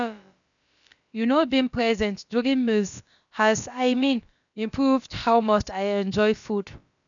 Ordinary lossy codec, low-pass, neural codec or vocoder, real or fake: none; 7.2 kHz; codec, 16 kHz, about 1 kbps, DyCAST, with the encoder's durations; fake